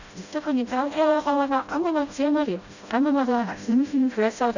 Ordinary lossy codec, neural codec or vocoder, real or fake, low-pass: none; codec, 16 kHz, 0.5 kbps, FreqCodec, smaller model; fake; 7.2 kHz